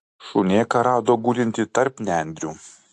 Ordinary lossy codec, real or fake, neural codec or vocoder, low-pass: AAC, 64 kbps; real; none; 10.8 kHz